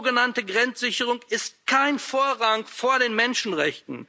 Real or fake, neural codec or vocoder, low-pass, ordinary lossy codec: real; none; none; none